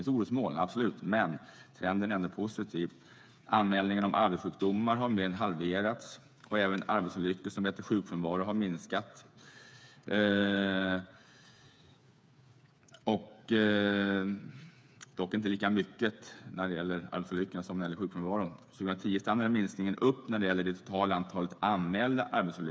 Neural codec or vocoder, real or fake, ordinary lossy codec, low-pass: codec, 16 kHz, 8 kbps, FreqCodec, smaller model; fake; none; none